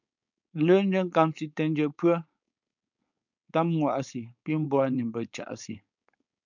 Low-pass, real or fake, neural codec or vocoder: 7.2 kHz; fake; codec, 16 kHz, 4.8 kbps, FACodec